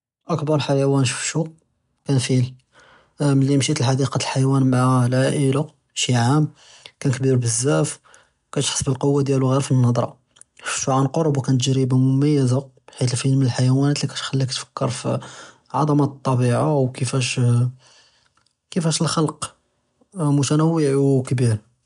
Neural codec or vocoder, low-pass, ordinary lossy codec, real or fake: none; 10.8 kHz; none; real